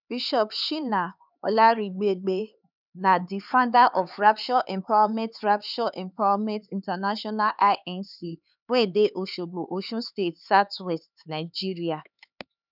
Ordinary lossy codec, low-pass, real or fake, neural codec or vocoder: none; 5.4 kHz; fake; codec, 16 kHz, 4 kbps, X-Codec, HuBERT features, trained on LibriSpeech